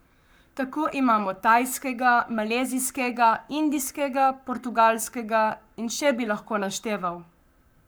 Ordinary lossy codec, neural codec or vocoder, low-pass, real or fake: none; codec, 44.1 kHz, 7.8 kbps, Pupu-Codec; none; fake